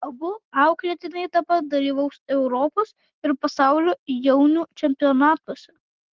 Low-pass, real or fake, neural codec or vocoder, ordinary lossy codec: 7.2 kHz; real; none; Opus, 16 kbps